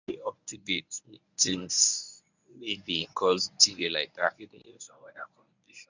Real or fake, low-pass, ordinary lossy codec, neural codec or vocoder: fake; 7.2 kHz; none; codec, 24 kHz, 0.9 kbps, WavTokenizer, medium speech release version 1